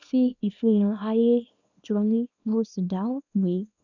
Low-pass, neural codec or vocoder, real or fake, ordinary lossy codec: 7.2 kHz; codec, 24 kHz, 0.9 kbps, WavTokenizer, small release; fake; none